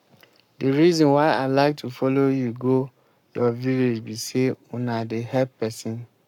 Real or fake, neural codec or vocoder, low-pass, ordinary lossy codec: fake; codec, 44.1 kHz, 7.8 kbps, Pupu-Codec; 19.8 kHz; none